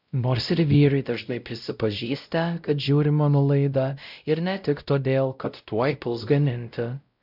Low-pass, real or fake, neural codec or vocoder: 5.4 kHz; fake; codec, 16 kHz, 0.5 kbps, X-Codec, WavLM features, trained on Multilingual LibriSpeech